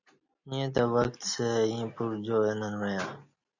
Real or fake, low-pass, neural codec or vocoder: real; 7.2 kHz; none